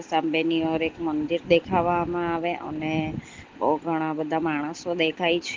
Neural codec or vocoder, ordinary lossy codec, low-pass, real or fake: none; Opus, 16 kbps; 7.2 kHz; real